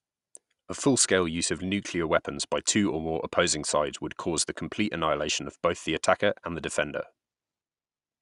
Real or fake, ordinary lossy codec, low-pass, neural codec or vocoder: real; Opus, 64 kbps; 10.8 kHz; none